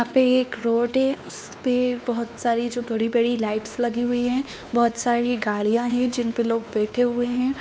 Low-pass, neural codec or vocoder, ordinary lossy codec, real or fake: none; codec, 16 kHz, 2 kbps, X-Codec, WavLM features, trained on Multilingual LibriSpeech; none; fake